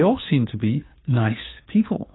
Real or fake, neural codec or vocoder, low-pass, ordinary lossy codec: fake; codec, 16 kHz, 2 kbps, FunCodec, trained on Chinese and English, 25 frames a second; 7.2 kHz; AAC, 16 kbps